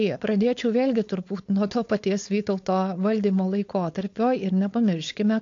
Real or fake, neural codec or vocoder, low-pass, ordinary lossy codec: fake; codec, 16 kHz, 4.8 kbps, FACodec; 7.2 kHz; AAC, 48 kbps